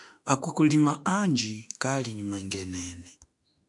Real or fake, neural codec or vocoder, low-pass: fake; autoencoder, 48 kHz, 32 numbers a frame, DAC-VAE, trained on Japanese speech; 10.8 kHz